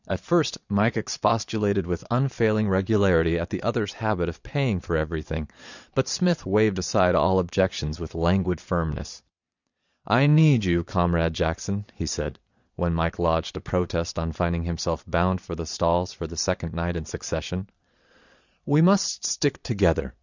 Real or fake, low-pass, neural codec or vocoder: fake; 7.2 kHz; vocoder, 22.05 kHz, 80 mel bands, Vocos